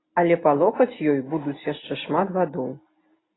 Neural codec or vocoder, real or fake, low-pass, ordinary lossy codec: none; real; 7.2 kHz; AAC, 16 kbps